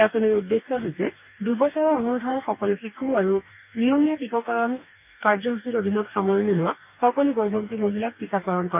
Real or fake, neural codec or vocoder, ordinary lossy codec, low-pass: fake; codec, 44.1 kHz, 2.6 kbps, DAC; none; 3.6 kHz